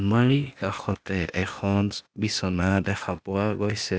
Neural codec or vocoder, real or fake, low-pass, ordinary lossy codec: codec, 16 kHz, 0.8 kbps, ZipCodec; fake; none; none